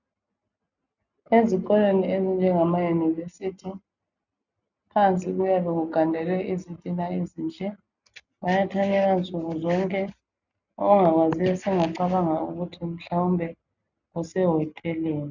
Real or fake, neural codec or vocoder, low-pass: real; none; 7.2 kHz